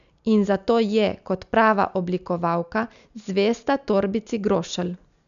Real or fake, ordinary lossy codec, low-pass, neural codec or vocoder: real; none; 7.2 kHz; none